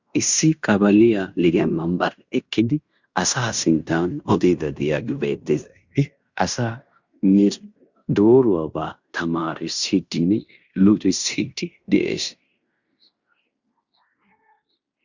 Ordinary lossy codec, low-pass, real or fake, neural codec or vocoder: Opus, 64 kbps; 7.2 kHz; fake; codec, 16 kHz in and 24 kHz out, 0.9 kbps, LongCat-Audio-Codec, fine tuned four codebook decoder